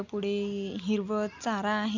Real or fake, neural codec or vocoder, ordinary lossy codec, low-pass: real; none; none; 7.2 kHz